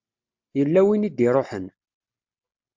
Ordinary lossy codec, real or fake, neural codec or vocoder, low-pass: MP3, 64 kbps; real; none; 7.2 kHz